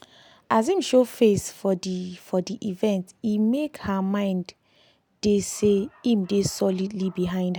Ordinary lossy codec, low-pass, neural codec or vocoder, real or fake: none; none; none; real